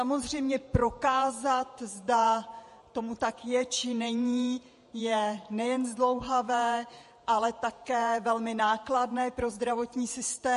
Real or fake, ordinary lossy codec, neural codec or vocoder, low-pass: fake; MP3, 48 kbps; vocoder, 48 kHz, 128 mel bands, Vocos; 14.4 kHz